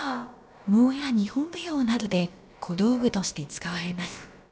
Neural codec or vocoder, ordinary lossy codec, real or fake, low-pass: codec, 16 kHz, about 1 kbps, DyCAST, with the encoder's durations; none; fake; none